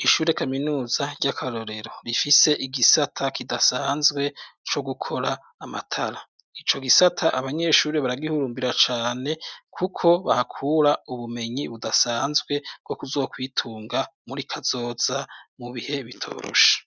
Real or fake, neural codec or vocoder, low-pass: real; none; 7.2 kHz